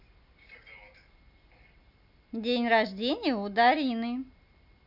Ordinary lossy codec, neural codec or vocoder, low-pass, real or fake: none; none; 5.4 kHz; real